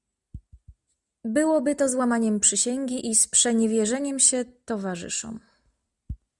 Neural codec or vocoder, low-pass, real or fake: none; 10.8 kHz; real